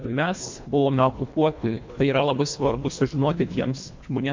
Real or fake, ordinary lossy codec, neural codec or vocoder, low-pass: fake; MP3, 48 kbps; codec, 24 kHz, 1.5 kbps, HILCodec; 7.2 kHz